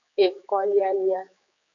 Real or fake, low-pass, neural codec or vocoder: fake; 7.2 kHz; codec, 16 kHz, 4 kbps, X-Codec, HuBERT features, trained on general audio